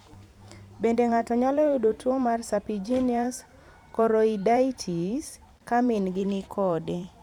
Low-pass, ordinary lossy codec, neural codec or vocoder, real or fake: 19.8 kHz; none; vocoder, 44.1 kHz, 128 mel bands every 512 samples, BigVGAN v2; fake